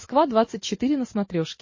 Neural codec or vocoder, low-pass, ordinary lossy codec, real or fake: none; 7.2 kHz; MP3, 32 kbps; real